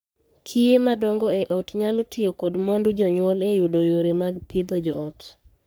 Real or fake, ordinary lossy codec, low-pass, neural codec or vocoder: fake; none; none; codec, 44.1 kHz, 3.4 kbps, Pupu-Codec